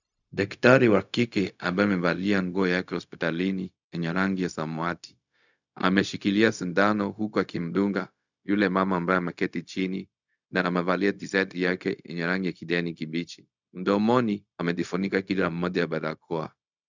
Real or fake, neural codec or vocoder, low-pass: fake; codec, 16 kHz, 0.4 kbps, LongCat-Audio-Codec; 7.2 kHz